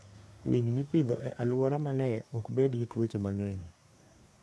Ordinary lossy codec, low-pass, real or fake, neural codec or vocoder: none; none; fake; codec, 24 kHz, 1 kbps, SNAC